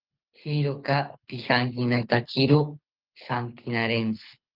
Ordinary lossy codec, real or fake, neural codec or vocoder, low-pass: Opus, 24 kbps; fake; codec, 24 kHz, 6 kbps, HILCodec; 5.4 kHz